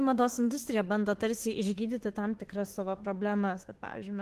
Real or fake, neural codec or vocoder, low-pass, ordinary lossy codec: fake; autoencoder, 48 kHz, 32 numbers a frame, DAC-VAE, trained on Japanese speech; 14.4 kHz; Opus, 16 kbps